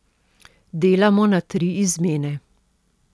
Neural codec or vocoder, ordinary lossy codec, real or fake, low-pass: none; none; real; none